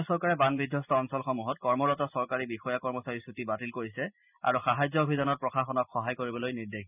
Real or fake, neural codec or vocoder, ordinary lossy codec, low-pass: real; none; none; 3.6 kHz